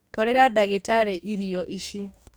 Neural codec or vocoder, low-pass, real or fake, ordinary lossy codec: codec, 44.1 kHz, 2.6 kbps, DAC; none; fake; none